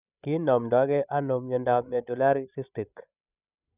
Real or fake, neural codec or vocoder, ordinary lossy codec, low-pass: fake; codec, 16 kHz, 16 kbps, FreqCodec, larger model; none; 3.6 kHz